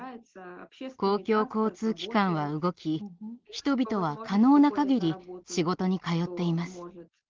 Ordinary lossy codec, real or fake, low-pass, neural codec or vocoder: Opus, 16 kbps; real; 7.2 kHz; none